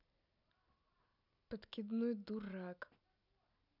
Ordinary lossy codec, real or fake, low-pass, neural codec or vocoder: none; real; 5.4 kHz; none